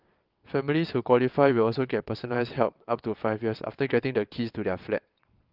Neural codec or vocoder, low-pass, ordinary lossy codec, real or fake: vocoder, 22.05 kHz, 80 mel bands, WaveNeXt; 5.4 kHz; Opus, 32 kbps; fake